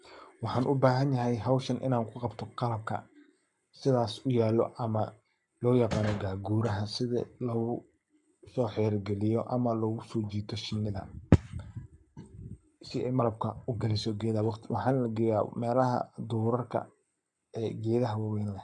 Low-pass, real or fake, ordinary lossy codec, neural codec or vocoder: 10.8 kHz; fake; none; codec, 44.1 kHz, 7.8 kbps, Pupu-Codec